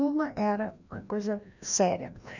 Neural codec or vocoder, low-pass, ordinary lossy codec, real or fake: codec, 16 kHz, 1 kbps, FreqCodec, larger model; 7.2 kHz; none; fake